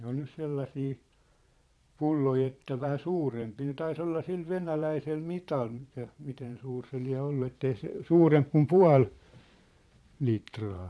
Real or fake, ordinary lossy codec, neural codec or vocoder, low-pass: fake; none; vocoder, 22.05 kHz, 80 mel bands, Vocos; none